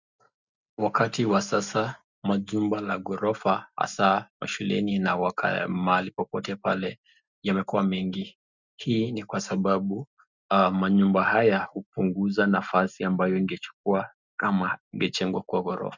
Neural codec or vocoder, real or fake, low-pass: none; real; 7.2 kHz